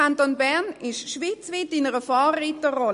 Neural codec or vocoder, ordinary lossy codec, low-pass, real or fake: none; MP3, 48 kbps; 10.8 kHz; real